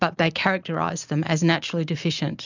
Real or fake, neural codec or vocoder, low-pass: real; none; 7.2 kHz